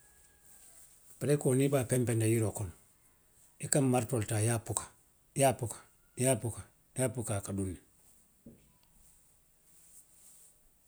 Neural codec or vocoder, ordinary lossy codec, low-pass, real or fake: none; none; none; real